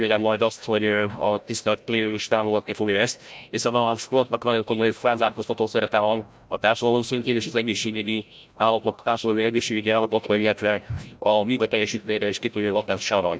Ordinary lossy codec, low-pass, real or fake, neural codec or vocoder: none; none; fake; codec, 16 kHz, 0.5 kbps, FreqCodec, larger model